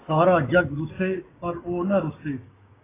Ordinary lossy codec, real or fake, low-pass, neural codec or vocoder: AAC, 16 kbps; fake; 3.6 kHz; codec, 16 kHz, 6 kbps, DAC